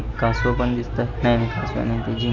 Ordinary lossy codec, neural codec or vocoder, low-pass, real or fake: none; none; 7.2 kHz; real